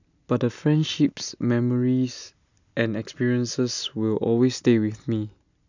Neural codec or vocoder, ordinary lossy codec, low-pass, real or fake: none; none; 7.2 kHz; real